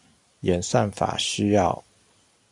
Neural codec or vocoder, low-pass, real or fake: none; 10.8 kHz; real